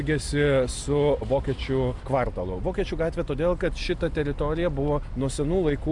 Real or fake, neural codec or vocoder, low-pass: real; none; 10.8 kHz